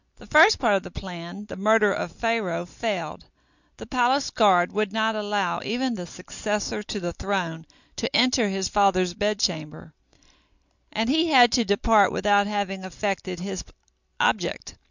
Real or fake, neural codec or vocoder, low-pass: real; none; 7.2 kHz